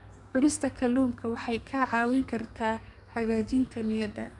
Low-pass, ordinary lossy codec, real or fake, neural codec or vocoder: 10.8 kHz; none; fake; codec, 32 kHz, 1.9 kbps, SNAC